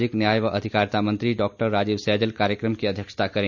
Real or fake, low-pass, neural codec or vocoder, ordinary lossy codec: real; 7.2 kHz; none; none